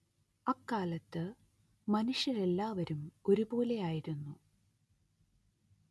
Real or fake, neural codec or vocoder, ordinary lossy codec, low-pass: real; none; none; none